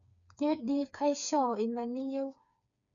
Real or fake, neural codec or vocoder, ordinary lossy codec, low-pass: fake; codec, 16 kHz, 4 kbps, FreqCodec, smaller model; none; 7.2 kHz